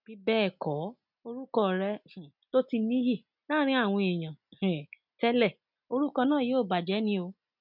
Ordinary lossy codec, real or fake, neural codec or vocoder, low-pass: none; real; none; 5.4 kHz